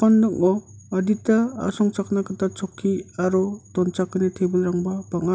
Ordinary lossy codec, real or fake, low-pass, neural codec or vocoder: none; real; none; none